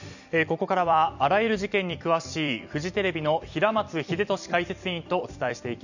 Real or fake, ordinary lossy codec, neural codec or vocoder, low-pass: fake; none; vocoder, 44.1 kHz, 128 mel bands every 256 samples, BigVGAN v2; 7.2 kHz